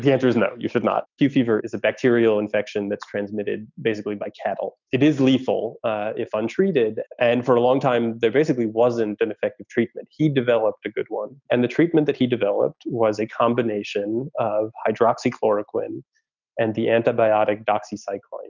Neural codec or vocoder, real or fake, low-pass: none; real; 7.2 kHz